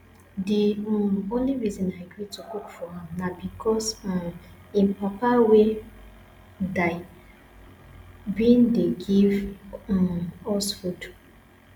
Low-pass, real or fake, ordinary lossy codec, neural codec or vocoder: 19.8 kHz; real; none; none